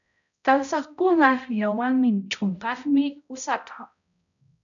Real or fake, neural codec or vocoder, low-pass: fake; codec, 16 kHz, 0.5 kbps, X-Codec, HuBERT features, trained on balanced general audio; 7.2 kHz